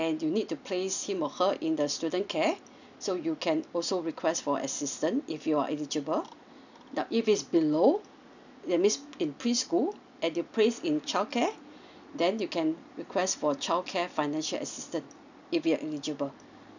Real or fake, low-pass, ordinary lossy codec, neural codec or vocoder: real; 7.2 kHz; none; none